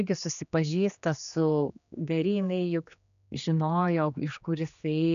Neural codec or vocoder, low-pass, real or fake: codec, 16 kHz, 2 kbps, X-Codec, HuBERT features, trained on general audio; 7.2 kHz; fake